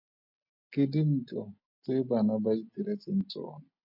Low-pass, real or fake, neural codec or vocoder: 5.4 kHz; real; none